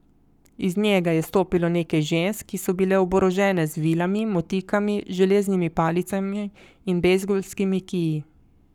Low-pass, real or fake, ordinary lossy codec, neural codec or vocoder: 19.8 kHz; fake; none; codec, 44.1 kHz, 7.8 kbps, Pupu-Codec